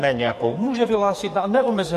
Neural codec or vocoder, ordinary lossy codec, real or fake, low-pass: codec, 44.1 kHz, 2.6 kbps, SNAC; MP3, 64 kbps; fake; 14.4 kHz